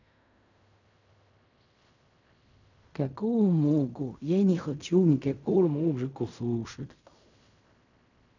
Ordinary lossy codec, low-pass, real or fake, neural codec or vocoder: none; 7.2 kHz; fake; codec, 16 kHz in and 24 kHz out, 0.4 kbps, LongCat-Audio-Codec, fine tuned four codebook decoder